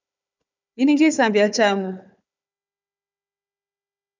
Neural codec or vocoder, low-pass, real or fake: codec, 16 kHz, 4 kbps, FunCodec, trained on Chinese and English, 50 frames a second; 7.2 kHz; fake